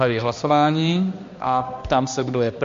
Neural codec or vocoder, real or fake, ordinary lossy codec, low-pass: codec, 16 kHz, 1 kbps, X-Codec, HuBERT features, trained on balanced general audio; fake; MP3, 48 kbps; 7.2 kHz